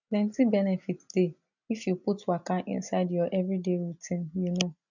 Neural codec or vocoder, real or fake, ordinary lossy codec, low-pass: none; real; none; 7.2 kHz